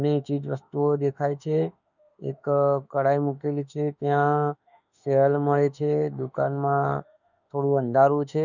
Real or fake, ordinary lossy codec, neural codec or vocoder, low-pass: fake; none; autoencoder, 48 kHz, 32 numbers a frame, DAC-VAE, trained on Japanese speech; 7.2 kHz